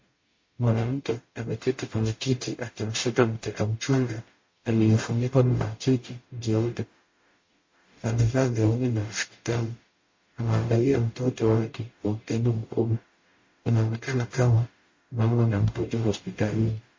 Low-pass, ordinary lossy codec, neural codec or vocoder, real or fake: 7.2 kHz; MP3, 32 kbps; codec, 44.1 kHz, 0.9 kbps, DAC; fake